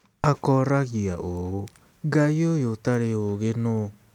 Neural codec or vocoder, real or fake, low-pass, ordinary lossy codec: none; real; 19.8 kHz; none